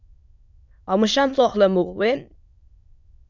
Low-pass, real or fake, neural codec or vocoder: 7.2 kHz; fake; autoencoder, 22.05 kHz, a latent of 192 numbers a frame, VITS, trained on many speakers